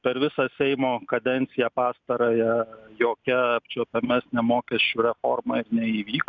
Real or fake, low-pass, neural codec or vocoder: real; 7.2 kHz; none